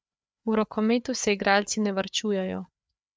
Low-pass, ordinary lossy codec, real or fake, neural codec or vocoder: none; none; fake; codec, 16 kHz, 4 kbps, FreqCodec, larger model